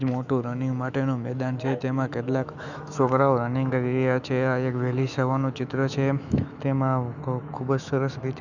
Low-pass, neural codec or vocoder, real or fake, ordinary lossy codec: 7.2 kHz; autoencoder, 48 kHz, 128 numbers a frame, DAC-VAE, trained on Japanese speech; fake; none